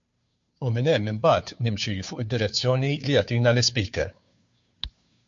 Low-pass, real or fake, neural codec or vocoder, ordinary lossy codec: 7.2 kHz; fake; codec, 16 kHz, 2 kbps, FunCodec, trained on Chinese and English, 25 frames a second; MP3, 48 kbps